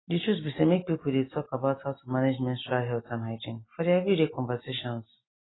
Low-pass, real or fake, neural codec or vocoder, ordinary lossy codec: 7.2 kHz; real; none; AAC, 16 kbps